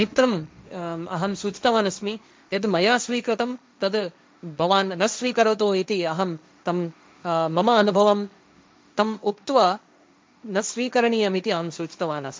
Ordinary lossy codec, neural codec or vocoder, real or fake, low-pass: none; codec, 16 kHz, 1.1 kbps, Voila-Tokenizer; fake; none